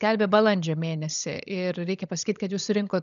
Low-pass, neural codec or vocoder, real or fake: 7.2 kHz; codec, 16 kHz, 16 kbps, FunCodec, trained on LibriTTS, 50 frames a second; fake